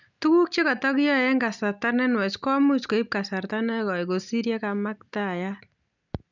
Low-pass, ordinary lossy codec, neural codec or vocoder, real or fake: 7.2 kHz; none; none; real